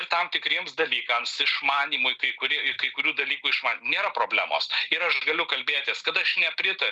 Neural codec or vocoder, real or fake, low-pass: none; real; 10.8 kHz